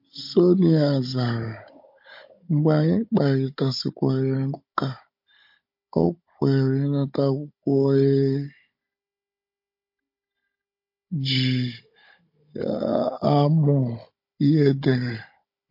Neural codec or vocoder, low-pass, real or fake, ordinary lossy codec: codec, 16 kHz, 16 kbps, FunCodec, trained on Chinese and English, 50 frames a second; 5.4 kHz; fake; MP3, 32 kbps